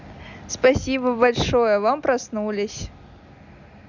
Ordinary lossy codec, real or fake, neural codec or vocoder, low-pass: none; real; none; 7.2 kHz